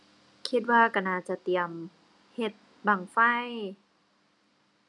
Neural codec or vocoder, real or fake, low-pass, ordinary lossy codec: none; real; 10.8 kHz; none